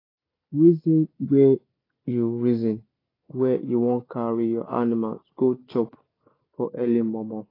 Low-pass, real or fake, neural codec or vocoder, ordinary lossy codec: 5.4 kHz; real; none; AAC, 24 kbps